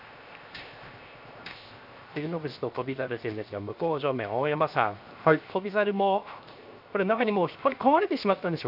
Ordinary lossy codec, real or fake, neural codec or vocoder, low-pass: none; fake; codec, 16 kHz, 0.7 kbps, FocalCodec; 5.4 kHz